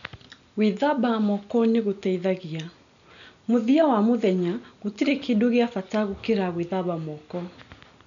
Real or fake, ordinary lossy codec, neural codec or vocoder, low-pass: real; none; none; 7.2 kHz